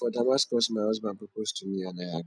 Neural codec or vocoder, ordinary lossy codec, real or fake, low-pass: none; none; real; 9.9 kHz